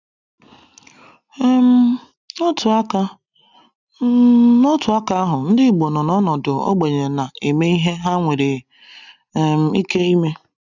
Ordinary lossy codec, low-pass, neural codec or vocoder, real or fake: none; 7.2 kHz; none; real